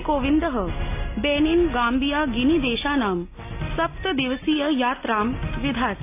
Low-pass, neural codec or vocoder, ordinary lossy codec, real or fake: 3.6 kHz; none; AAC, 24 kbps; real